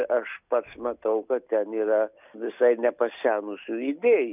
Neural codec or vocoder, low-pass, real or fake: none; 3.6 kHz; real